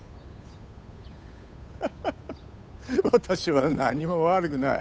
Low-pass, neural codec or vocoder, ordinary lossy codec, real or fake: none; codec, 16 kHz, 8 kbps, FunCodec, trained on Chinese and English, 25 frames a second; none; fake